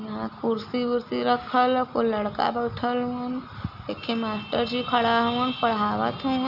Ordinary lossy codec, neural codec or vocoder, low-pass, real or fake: none; none; 5.4 kHz; real